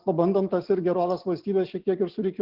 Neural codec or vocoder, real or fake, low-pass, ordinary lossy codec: none; real; 5.4 kHz; Opus, 16 kbps